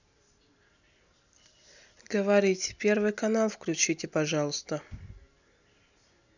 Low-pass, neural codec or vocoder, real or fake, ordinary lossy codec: 7.2 kHz; none; real; none